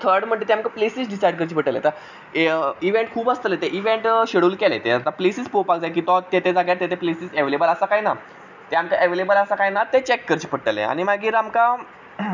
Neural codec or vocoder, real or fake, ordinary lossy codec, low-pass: none; real; none; 7.2 kHz